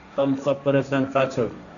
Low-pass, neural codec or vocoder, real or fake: 7.2 kHz; codec, 16 kHz, 1.1 kbps, Voila-Tokenizer; fake